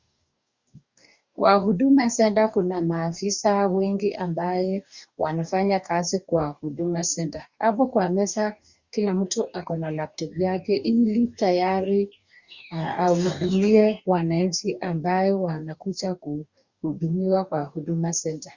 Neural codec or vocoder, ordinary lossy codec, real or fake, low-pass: codec, 44.1 kHz, 2.6 kbps, DAC; Opus, 64 kbps; fake; 7.2 kHz